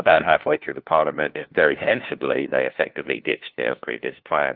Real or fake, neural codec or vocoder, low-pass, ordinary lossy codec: fake; codec, 16 kHz, 1 kbps, FunCodec, trained on LibriTTS, 50 frames a second; 5.4 kHz; Opus, 32 kbps